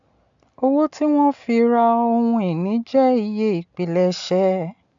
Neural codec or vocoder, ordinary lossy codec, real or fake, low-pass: none; MP3, 64 kbps; real; 7.2 kHz